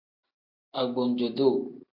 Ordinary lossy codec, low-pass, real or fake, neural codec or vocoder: AAC, 48 kbps; 5.4 kHz; real; none